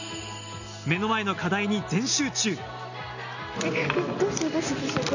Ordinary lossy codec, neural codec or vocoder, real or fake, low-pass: none; none; real; 7.2 kHz